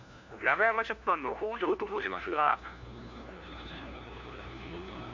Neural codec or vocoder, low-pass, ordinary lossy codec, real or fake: codec, 16 kHz, 1 kbps, FunCodec, trained on LibriTTS, 50 frames a second; 7.2 kHz; MP3, 64 kbps; fake